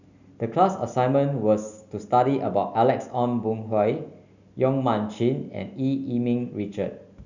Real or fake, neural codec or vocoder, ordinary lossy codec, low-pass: real; none; none; 7.2 kHz